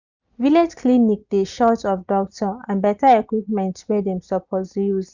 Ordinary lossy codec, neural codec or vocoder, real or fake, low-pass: none; none; real; 7.2 kHz